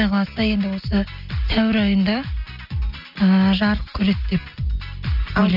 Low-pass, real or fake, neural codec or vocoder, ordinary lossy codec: 5.4 kHz; real; none; none